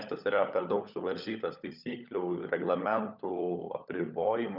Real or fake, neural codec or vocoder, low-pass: fake; codec, 16 kHz, 16 kbps, FunCodec, trained on LibriTTS, 50 frames a second; 5.4 kHz